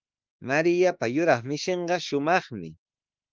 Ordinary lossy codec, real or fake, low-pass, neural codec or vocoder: Opus, 32 kbps; fake; 7.2 kHz; autoencoder, 48 kHz, 32 numbers a frame, DAC-VAE, trained on Japanese speech